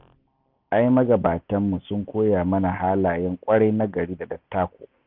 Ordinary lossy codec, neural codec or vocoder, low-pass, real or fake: none; none; 5.4 kHz; real